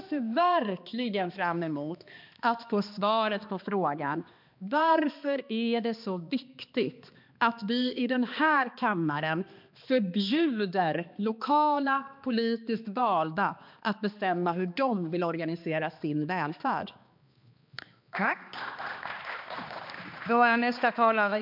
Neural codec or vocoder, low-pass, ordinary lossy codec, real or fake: codec, 16 kHz, 2 kbps, X-Codec, HuBERT features, trained on balanced general audio; 5.4 kHz; MP3, 48 kbps; fake